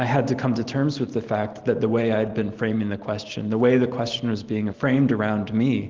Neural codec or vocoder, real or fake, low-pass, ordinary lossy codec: none; real; 7.2 kHz; Opus, 16 kbps